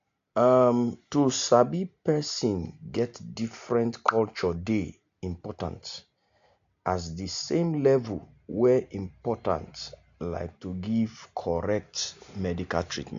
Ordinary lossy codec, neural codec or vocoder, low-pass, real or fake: AAC, 64 kbps; none; 7.2 kHz; real